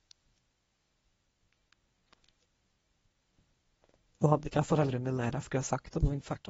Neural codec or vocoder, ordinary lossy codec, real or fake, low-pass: codec, 24 kHz, 0.9 kbps, WavTokenizer, medium speech release version 1; AAC, 24 kbps; fake; 10.8 kHz